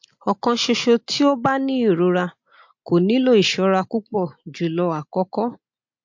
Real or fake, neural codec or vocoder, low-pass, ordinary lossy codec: real; none; 7.2 kHz; MP3, 48 kbps